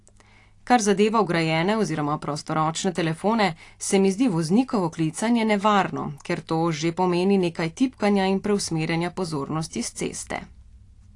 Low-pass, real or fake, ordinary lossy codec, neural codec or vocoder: 10.8 kHz; real; AAC, 48 kbps; none